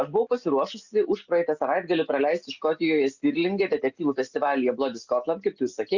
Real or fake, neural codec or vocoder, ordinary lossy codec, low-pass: real; none; Opus, 64 kbps; 7.2 kHz